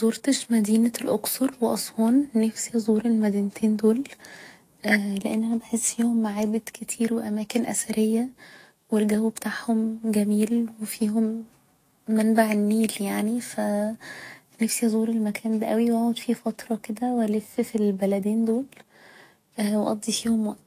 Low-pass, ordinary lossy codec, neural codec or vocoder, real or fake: 14.4 kHz; AAC, 48 kbps; autoencoder, 48 kHz, 128 numbers a frame, DAC-VAE, trained on Japanese speech; fake